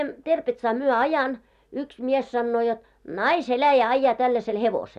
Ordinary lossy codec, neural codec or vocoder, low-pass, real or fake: none; none; 14.4 kHz; real